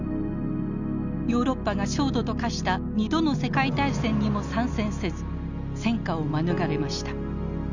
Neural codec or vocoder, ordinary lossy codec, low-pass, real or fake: none; none; 7.2 kHz; real